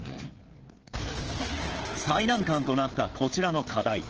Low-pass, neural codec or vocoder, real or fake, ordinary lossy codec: 7.2 kHz; codec, 16 kHz, 4 kbps, FreqCodec, larger model; fake; Opus, 16 kbps